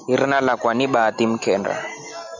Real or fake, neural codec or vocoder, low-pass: real; none; 7.2 kHz